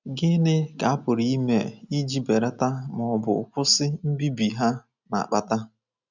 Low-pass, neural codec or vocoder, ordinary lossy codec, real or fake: 7.2 kHz; none; none; real